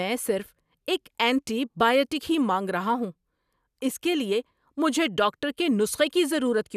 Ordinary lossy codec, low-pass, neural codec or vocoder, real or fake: none; 14.4 kHz; none; real